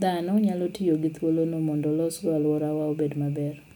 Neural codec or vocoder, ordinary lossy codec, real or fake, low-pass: none; none; real; none